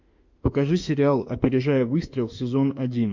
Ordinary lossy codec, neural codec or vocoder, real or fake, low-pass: MP3, 64 kbps; autoencoder, 48 kHz, 32 numbers a frame, DAC-VAE, trained on Japanese speech; fake; 7.2 kHz